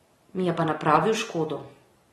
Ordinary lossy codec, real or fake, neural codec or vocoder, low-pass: AAC, 32 kbps; real; none; 19.8 kHz